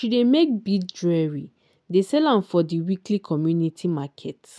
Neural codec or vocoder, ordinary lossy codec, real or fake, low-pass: none; none; real; none